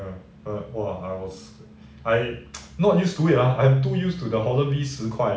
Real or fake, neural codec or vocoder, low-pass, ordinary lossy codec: real; none; none; none